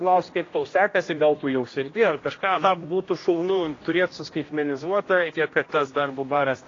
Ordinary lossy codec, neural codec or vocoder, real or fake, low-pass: AAC, 32 kbps; codec, 16 kHz, 1 kbps, X-Codec, HuBERT features, trained on general audio; fake; 7.2 kHz